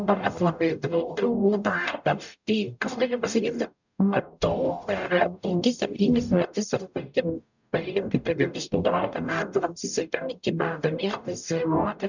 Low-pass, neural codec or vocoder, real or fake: 7.2 kHz; codec, 44.1 kHz, 0.9 kbps, DAC; fake